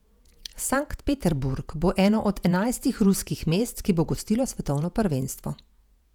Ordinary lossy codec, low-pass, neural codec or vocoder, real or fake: none; 19.8 kHz; vocoder, 48 kHz, 128 mel bands, Vocos; fake